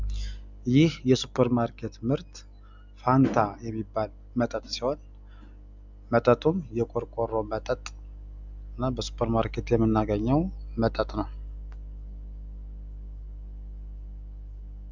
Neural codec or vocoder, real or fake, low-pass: none; real; 7.2 kHz